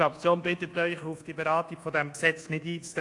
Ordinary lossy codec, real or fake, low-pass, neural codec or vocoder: AAC, 48 kbps; fake; 10.8 kHz; codec, 24 kHz, 1.2 kbps, DualCodec